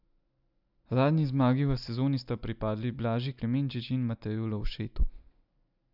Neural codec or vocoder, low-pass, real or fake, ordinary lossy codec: none; 5.4 kHz; real; none